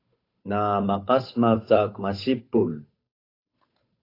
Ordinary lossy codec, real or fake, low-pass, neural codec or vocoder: AAC, 32 kbps; fake; 5.4 kHz; codec, 16 kHz, 8 kbps, FunCodec, trained on Chinese and English, 25 frames a second